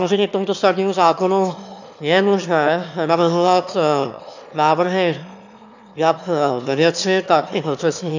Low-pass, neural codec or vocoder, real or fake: 7.2 kHz; autoencoder, 22.05 kHz, a latent of 192 numbers a frame, VITS, trained on one speaker; fake